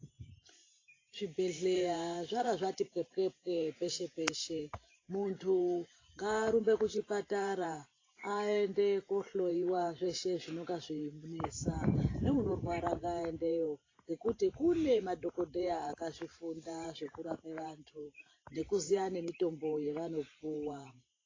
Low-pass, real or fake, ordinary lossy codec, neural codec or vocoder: 7.2 kHz; fake; AAC, 32 kbps; vocoder, 44.1 kHz, 128 mel bands every 512 samples, BigVGAN v2